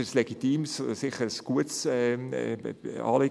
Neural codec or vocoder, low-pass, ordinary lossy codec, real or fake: none; none; none; real